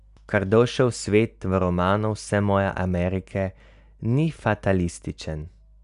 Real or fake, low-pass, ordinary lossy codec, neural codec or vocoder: real; 10.8 kHz; none; none